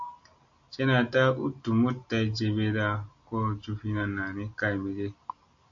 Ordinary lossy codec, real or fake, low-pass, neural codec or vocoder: AAC, 64 kbps; real; 7.2 kHz; none